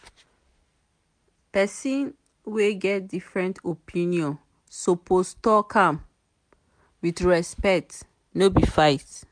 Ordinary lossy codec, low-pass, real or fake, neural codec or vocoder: MP3, 64 kbps; 9.9 kHz; real; none